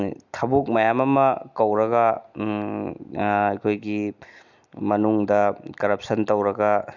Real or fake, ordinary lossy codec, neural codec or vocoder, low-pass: real; none; none; 7.2 kHz